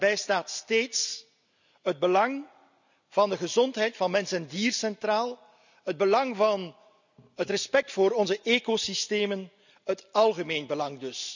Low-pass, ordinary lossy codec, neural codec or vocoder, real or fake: 7.2 kHz; none; none; real